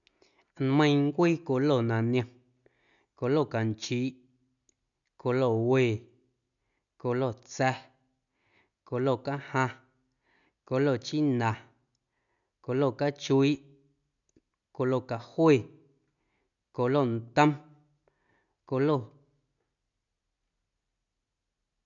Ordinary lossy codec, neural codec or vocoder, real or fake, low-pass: none; none; real; 7.2 kHz